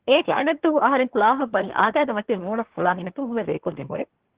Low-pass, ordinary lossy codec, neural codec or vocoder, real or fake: 3.6 kHz; Opus, 16 kbps; codec, 24 kHz, 1 kbps, SNAC; fake